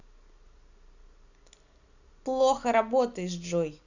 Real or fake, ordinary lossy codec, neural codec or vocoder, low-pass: real; none; none; 7.2 kHz